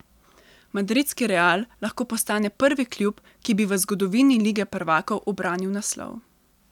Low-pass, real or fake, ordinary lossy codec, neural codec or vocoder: 19.8 kHz; real; none; none